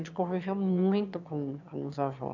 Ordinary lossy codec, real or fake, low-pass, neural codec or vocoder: none; fake; 7.2 kHz; autoencoder, 22.05 kHz, a latent of 192 numbers a frame, VITS, trained on one speaker